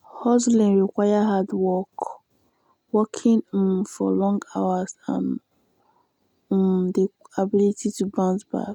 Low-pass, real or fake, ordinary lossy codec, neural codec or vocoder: 19.8 kHz; real; none; none